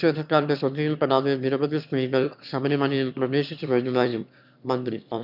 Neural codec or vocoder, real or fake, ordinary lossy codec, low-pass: autoencoder, 22.05 kHz, a latent of 192 numbers a frame, VITS, trained on one speaker; fake; none; 5.4 kHz